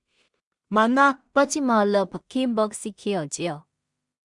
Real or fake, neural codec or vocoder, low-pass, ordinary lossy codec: fake; codec, 16 kHz in and 24 kHz out, 0.4 kbps, LongCat-Audio-Codec, two codebook decoder; 10.8 kHz; Opus, 64 kbps